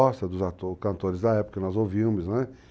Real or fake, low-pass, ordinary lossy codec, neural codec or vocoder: real; none; none; none